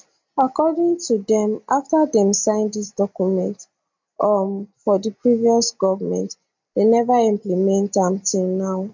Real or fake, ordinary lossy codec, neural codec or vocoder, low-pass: real; MP3, 64 kbps; none; 7.2 kHz